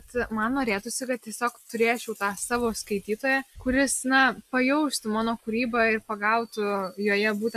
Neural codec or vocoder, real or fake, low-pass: none; real; 14.4 kHz